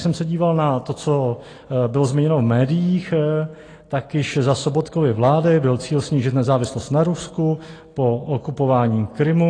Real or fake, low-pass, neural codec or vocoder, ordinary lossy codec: real; 9.9 kHz; none; AAC, 32 kbps